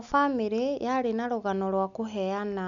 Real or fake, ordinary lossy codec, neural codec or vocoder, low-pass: real; none; none; 7.2 kHz